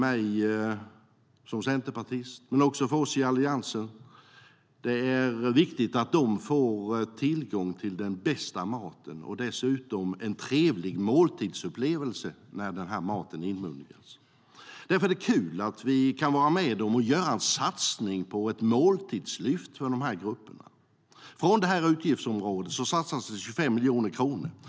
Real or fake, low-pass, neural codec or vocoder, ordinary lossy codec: real; none; none; none